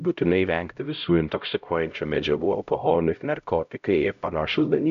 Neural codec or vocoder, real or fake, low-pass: codec, 16 kHz, 0.5 kbps, X-Codec, HuBERT features, trained on LibriSpeech; fake; 7.2 kHz